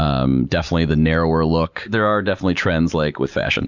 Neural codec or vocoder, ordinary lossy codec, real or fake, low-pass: none; Opus, 64 kbps; real; 7.2 kHz